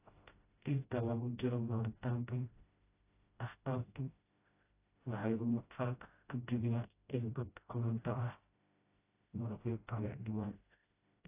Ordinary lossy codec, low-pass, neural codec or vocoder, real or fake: AAC, 24 kbps; 3.6 kHz; codec, 16 kHz, 0.5 kbps, FreqCodec, smaller model; fake